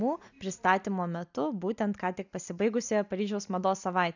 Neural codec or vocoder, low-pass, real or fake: none; 7.2 kHz; real